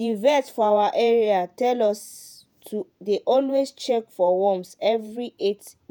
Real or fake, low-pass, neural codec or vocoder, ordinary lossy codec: fake; none; vocoder, 48 kHz, 128 mel bands, Vocos; none